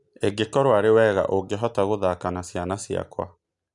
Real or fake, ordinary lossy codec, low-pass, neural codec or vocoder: real; none; 10.8 kHz; none